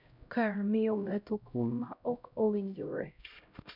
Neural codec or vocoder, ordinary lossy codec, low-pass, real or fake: codec, 16 kHz, 0.5 kbps, X-Codec, HuBERT features, trained on LibriSpeech; none; 5.4 kHz; fake